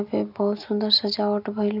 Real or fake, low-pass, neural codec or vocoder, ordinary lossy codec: real; 5.4 kHz; none; none